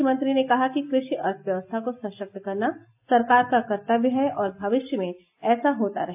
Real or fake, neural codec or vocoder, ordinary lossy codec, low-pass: real; none; AAC, 32 kbps; 3.6 kHz